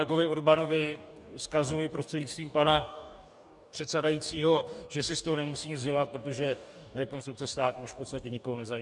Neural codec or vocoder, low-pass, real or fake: codec, 44.1 kHz, 2.6 kbps, DAC; 10.8 kHz; fake